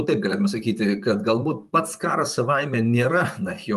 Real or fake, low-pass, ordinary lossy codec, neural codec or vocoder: fake; 14.4 kHz; Opus, 32 kbps; vocoder, 44.1 kHz, 128 mel bands, Pupu-Vocoder